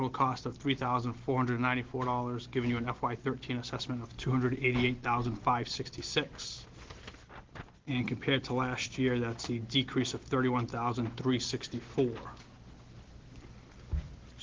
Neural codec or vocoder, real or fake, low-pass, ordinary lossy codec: none; real; 7.2 kHz; Opus, 16 kbps